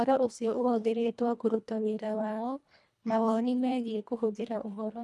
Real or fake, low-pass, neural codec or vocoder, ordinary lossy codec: fake; none; codec, 24 kHz, 1.5 kbps, HILCodec; none